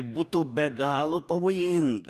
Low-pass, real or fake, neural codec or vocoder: 14.4 kHz; fake; codec, 44.1 kHz, 2.6 kbps, DAC